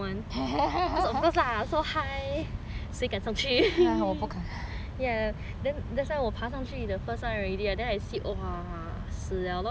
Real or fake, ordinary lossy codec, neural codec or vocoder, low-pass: real; none; none; none